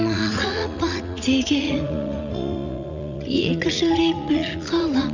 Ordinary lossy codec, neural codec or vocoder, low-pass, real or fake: none; vocoder, 44.1 kHz, 80 mel bands, Vocos; 7.2 kHz; fake